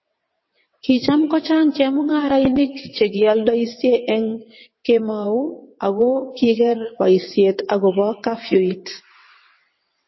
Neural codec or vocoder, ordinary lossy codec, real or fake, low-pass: vocoder, 22.05 kHz, 80 mel bands, WaveNeXt; MP3, 24 kbps; fake; 7.2 kHz